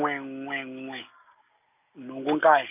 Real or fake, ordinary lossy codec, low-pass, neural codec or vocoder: real; none; 3.6 kHz; none